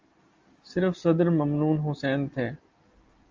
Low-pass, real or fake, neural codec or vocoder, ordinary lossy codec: 7.2 kHz; real; none; Opus, 32 kbps